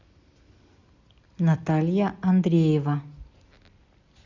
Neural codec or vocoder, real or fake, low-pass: none; real; 7.2 kHz